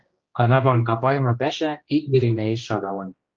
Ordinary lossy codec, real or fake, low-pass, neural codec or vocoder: Opus, 24 kbps; fake; 7.2 kHz; codec, 16 kHz, 1 kbps, X-Codec, HuBERT features, trained on general audio